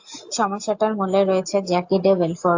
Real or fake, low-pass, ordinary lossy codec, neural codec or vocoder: real; 7.2 kHz; AAC, 48 kbps; none